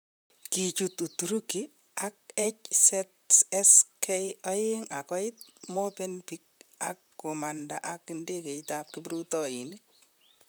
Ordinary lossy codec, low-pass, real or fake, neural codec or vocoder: none; none; fake; vocoder, 44.1 kHz, 128 mel bands, Pupu-Vocoder